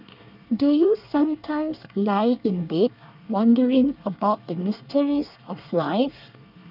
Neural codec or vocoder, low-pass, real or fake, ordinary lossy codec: codec, 24 kHz, 1 kbps, SNAC; 5.4 kHz; fake; none